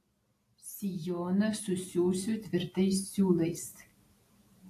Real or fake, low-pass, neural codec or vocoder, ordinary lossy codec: real; 14.4 kHz; none; AAC, 64 kbps